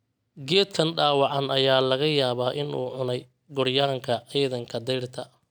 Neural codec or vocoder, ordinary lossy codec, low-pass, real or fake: none; none; none; real